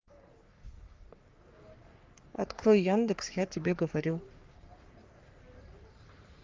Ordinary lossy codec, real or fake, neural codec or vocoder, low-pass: Opus, 32 kbps; fake; codec, 44.1 kHz, 3.4 kbps, Pupu-Codec; 7.2 kHz